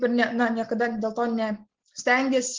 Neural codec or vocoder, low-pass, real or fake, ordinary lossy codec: none; 7.2 kHz; real; Opus, 16 kbps